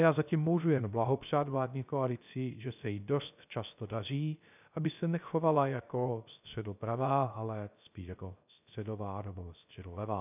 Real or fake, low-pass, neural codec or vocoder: fake; 3.6 kHz; codec, 16 kHz, 0.3 kbps, FocalCodec